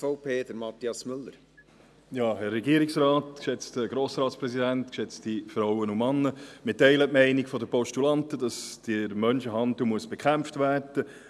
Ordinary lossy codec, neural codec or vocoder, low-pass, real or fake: none; none; none; real